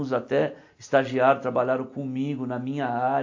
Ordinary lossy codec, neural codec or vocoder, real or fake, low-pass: AAC, 48 kbps; none; real; 7.2 kHz